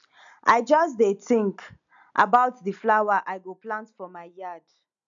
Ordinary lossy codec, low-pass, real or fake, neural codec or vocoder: none; 7.2 kHz; real; none